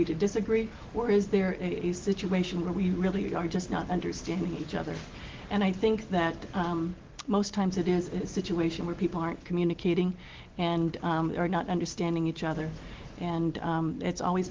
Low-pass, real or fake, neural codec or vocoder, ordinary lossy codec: 7.2 kHz; real; none; Opus, 16 kbps